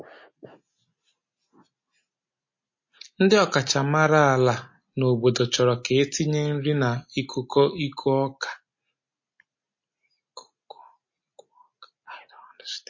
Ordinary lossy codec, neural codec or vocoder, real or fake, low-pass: MP3, 32 kbps; none; real; 7.2 kHz